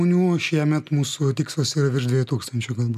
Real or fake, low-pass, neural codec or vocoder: real; 14.4 kHz; none